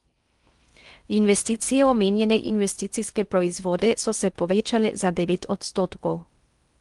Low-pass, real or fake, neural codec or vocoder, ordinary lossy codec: 10.8 kHz; fake; codec, 16 kHz in and 24 kHz out, 0.6 kbps, FocalCodec, streaming, 4096 codes; Opus, 32 kbps